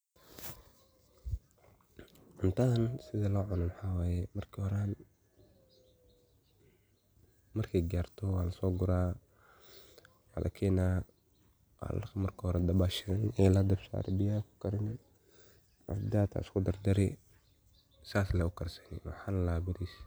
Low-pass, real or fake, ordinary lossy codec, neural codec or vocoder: none; real; none; none